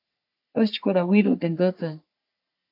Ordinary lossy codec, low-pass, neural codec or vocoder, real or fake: AAC, 48 kbps; 5.4 kHz; codec, 44.1 kHz, 3.4 kbps, Pupu-Codec; fake